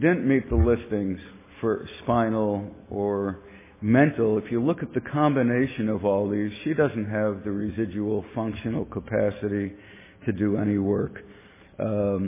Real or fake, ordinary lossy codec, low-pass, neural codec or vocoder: real; MP3, 16 kbps; 3.6 kHz; none